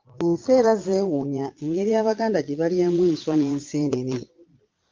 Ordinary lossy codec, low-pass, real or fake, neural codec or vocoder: Opus, 32 kbps; 7.2 kHz; fake; vocoder, 22.05 kHz, 80 mel bands, Vocos